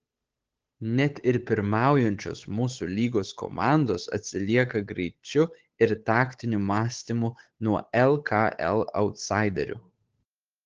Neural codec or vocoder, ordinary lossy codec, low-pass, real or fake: codec, 16 kHz, 8 kbps, FunCodec, trained on Chinese and English, 25 frames a second; Opus, 16 kbps; 7.2 kHz; fake